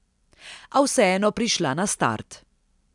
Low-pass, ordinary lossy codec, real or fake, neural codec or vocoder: 10.8 kHz; none; real; none